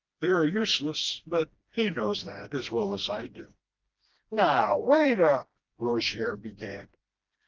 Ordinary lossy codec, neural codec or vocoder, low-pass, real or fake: Opus, 24 kbps; codec, 16 kHz, 1 kbps, FreqCodec, smaller model; 7.2 kHz; fake